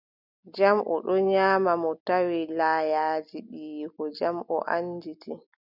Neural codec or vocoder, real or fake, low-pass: none; real; 5.4 kHz